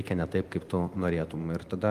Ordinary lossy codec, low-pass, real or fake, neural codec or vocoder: Opus, 32 kbps; 14.4 kHz; real; none